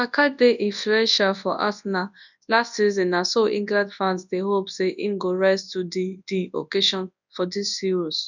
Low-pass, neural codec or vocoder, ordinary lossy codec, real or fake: 7.2 kHz; codec, 24 kHz, 0.9 kbps, WavTokenizer, large speech release; none; fake